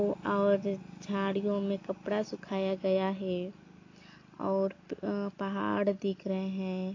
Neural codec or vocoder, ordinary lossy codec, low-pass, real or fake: none; AAC, 32 kbps; 7.2 kHz; real